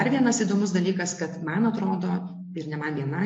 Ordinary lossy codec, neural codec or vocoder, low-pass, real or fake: MP3, 48 kbps; none; 9.9 kHz; real